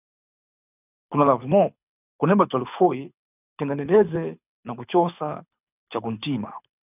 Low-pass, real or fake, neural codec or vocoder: 3.6 kHz; fake; codec, 24 kHz, 6 kbps, HILCodec